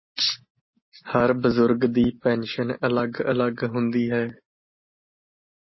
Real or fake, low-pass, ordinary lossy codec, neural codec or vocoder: real; 7.2 kHz; MP3, 24 kbps; none